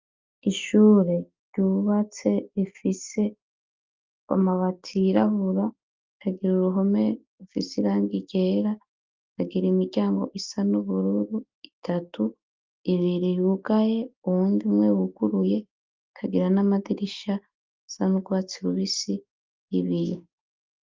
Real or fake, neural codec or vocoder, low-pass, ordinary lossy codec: real; none; 7.2 kHz; Opus, 24 kbps